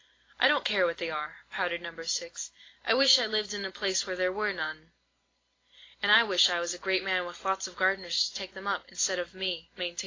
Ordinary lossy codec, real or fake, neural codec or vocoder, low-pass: AAC, 32 kbps; real; none; 7.2 kHz